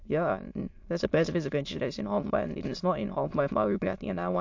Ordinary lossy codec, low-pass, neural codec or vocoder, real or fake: MP3, 48 kbps; 7.2 kHz; autoencoder, 22.05 kHz, a latent of 192 numbers a frame, VITS, trained on many speakers; fake